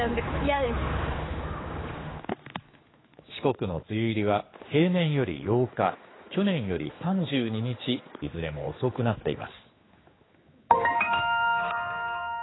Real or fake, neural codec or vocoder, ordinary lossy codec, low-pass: fake; codec, 16 kHz, 4 kbps, X-Codec, HuBERT features, trained on general audio; AAC, 16 kbps; 7.2 kHz